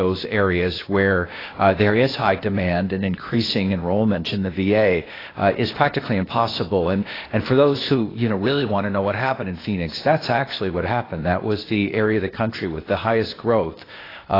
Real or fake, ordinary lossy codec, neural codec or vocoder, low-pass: fake; AAC, 24 kbps; codec, 16 kHz, 0.8 kbps, ZipCodec; 5.4 kHz